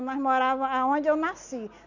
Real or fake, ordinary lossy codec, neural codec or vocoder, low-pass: real; none; none; 7.2 kHz